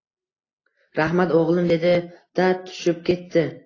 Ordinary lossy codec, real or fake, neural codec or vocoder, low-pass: AAC, 32 kbps; real; none; 7.2 kHz